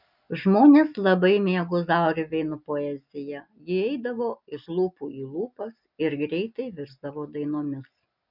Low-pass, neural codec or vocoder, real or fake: 5.4 kHz; none; real